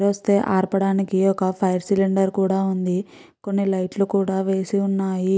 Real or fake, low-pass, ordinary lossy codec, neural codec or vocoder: real; none; none; none